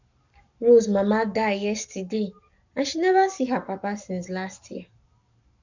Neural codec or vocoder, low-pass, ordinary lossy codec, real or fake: codec, 44.1 kHz, 7.8 kbps, Pupu-Codec; 7.2 kHz; none; fake